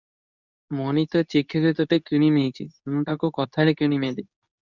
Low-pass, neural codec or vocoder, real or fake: 7.2 kHz; codec, 24 kHz, 0.9 kbps, WavTokenizer, medium speech release version 2; fake